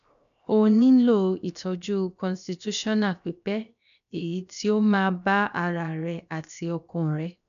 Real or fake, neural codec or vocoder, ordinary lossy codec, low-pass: fake; codec, 16 kHz, 0.7 kbps, FocalCodec; none; 7.2 kHz